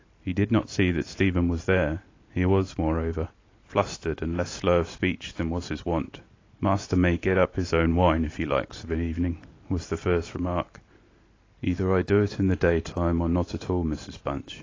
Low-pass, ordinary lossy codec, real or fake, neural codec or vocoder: 7.2 kHz; AAC, 32 kbps; real; none